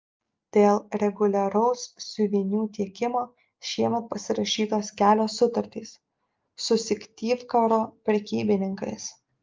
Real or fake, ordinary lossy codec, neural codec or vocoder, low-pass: real; Opus, 32 kbps; none; 7.2 kHz